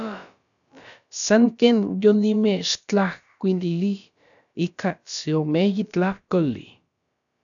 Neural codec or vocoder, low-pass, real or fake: codec, 16 kHz, about 1 kbps, DyCAST, with the encoder's durations; 7.2 kHz; fake